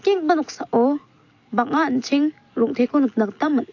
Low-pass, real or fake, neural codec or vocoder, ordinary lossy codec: 7.2 kHz; real; none; AAC, 48 kbps